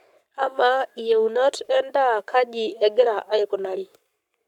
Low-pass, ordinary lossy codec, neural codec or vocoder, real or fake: 19.8 kHz; none; codec, 44.1 kHz, 7.8 kbps, Pupu-Codec; fake